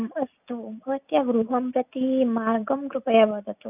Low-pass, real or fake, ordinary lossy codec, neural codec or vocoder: 3.6 kHz; real; none; none